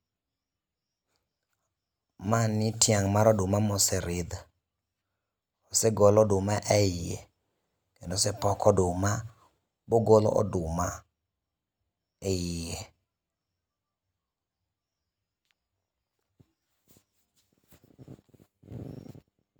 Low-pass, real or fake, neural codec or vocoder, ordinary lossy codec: none; real; none; none